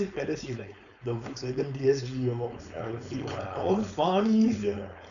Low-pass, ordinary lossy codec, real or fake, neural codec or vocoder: 7.2 kHz; none; fake; codec, 16 kHz, 4.8 kbps, FACodec